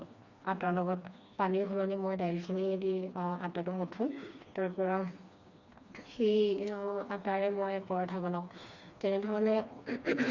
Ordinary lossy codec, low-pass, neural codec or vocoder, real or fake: Opus, 64 kbps; 7.2 kHz; codec, 16 kHz, 2 kbps, FreqCodec, smaller model; fake